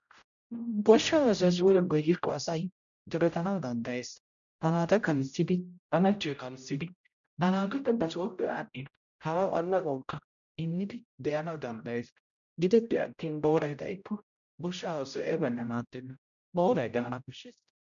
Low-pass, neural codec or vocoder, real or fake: 7.2 kHz; codec, 16 kHz, 0.5 kbps, X-Codec, HuBERT features, trained on general audio; fake